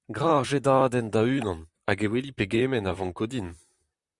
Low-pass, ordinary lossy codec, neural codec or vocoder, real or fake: 10.8 kHz; Opus, 64 kbps; vocoder, 44.1 kHz, 128 mel bands, Pupu-Vocoder; fake